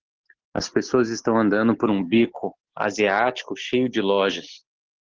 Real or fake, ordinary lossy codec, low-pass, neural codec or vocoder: real; Opus, 16 kbps; 7.2 kHz; none